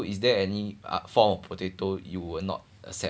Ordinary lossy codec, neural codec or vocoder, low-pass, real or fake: none; none; none; real